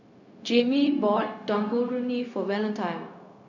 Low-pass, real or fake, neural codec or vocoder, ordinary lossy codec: 7.2 kHz; fake; codec, 16 kHz, 0.4 kbps, LongCat-Audio-Codec; none